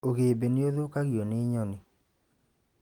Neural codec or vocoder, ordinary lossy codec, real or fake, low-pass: none; Opus, 32 kbps; real; 19.8 kHz